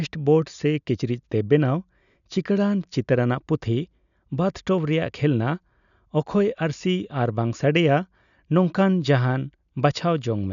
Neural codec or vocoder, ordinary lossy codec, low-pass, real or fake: none; none; 7.2 kHz; real